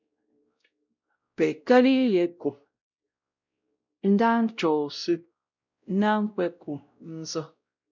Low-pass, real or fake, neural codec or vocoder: 7.2 kHz; fake; codec, 16 kHz, 0.5 kbps, X-Codec, WavLM features, trained on Multilingual LibriSpeech